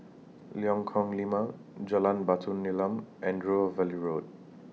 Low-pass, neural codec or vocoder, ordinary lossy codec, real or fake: none; none; none; real